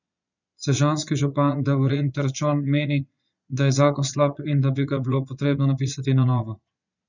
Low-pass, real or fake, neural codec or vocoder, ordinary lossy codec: 7.2 kHz; fake; vocoder, 22.05 kHz, 80 mel bands, Vocos; none